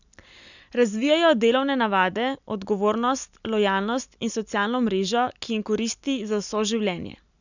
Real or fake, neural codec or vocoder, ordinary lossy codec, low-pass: real; none; none; 7.2 kHz